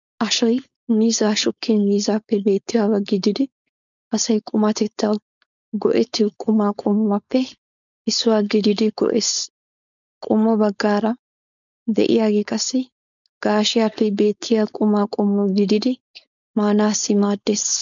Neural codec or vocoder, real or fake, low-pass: codec, 16 kHz, 4.8 kbps, FACodec; fake; 7.2 kHz